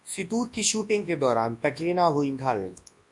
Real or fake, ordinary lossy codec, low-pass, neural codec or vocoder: fake; MP3, 64 kbps; 10.8 kHz; codec, 24 kHz, 0.9 kbps, WavTokenizer, large speech release